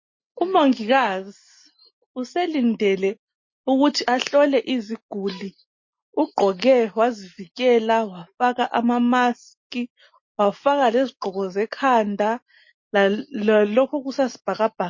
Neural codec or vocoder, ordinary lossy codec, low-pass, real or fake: none; MP3, 32 kbps; 7.2 kHz; real